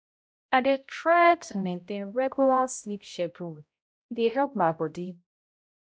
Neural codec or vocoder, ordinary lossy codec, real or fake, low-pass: codec, 16 kHz, 0.5 kbps, X-Codec, HuBERT features, trained on balanced general audio; none; fake; none